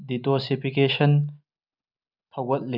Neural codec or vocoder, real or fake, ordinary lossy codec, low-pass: none; real; none; 5.4 kHz